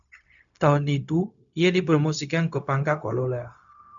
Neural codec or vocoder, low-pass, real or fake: codec, 16 kHz, 0.4 kbps, LongCat-Audio-Codec; 7.2 kHz; fake